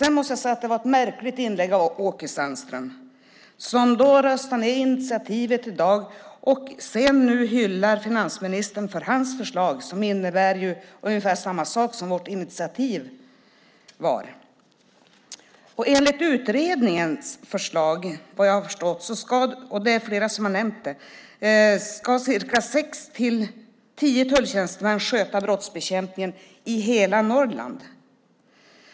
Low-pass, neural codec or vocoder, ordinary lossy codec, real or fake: none; none; none; real